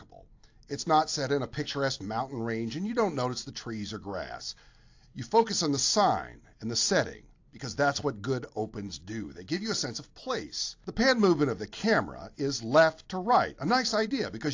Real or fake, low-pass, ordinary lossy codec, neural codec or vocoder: real; 7.2 kHz; AAC, 48 kbps; none